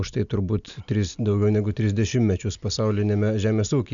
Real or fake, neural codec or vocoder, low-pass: real; none; 7.2 kHz